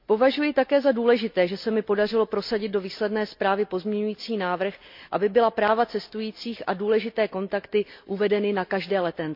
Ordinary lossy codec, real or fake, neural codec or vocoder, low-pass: MP3, 48 kbps; real; none; 5.4 kHz